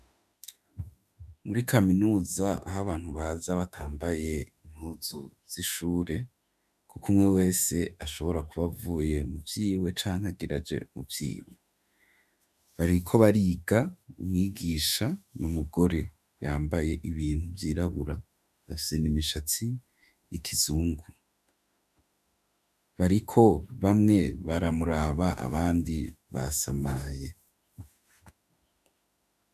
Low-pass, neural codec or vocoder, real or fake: 14.4 kHz; autoencoder, 48 kHz, 32 numbers a frame, DAC-VAE, trained on Japanese speech; fake